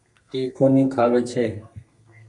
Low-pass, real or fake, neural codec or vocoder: 10.8 kHz; fake; codec, 32 kHz, 1.9 kbps, SNAC